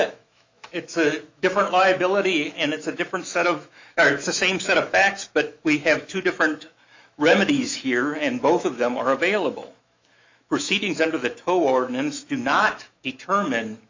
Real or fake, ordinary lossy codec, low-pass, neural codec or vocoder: fake; MP3, 64 kbps; 7.2 kHz; autoencoder, 48 kHz, 128 numbers a frame, DAC-VAE, trained on Japanese speech